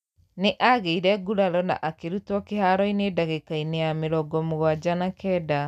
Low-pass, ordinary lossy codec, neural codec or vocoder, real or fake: 14.4 kHz; none; none; real